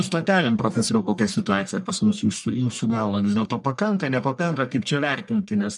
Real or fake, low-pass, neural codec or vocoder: fake; 10.8 kHz; codec, 44.1 kHz, 1.7 kbps, Pupu-Codec